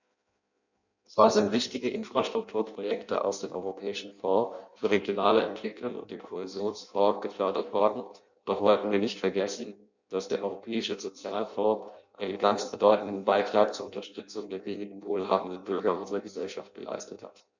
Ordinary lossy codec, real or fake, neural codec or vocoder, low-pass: none; fake; codec, 16 kHz in and 24 kHz out, 0.6 kbps, FireRedTTS-2 codec; 7.2 kHz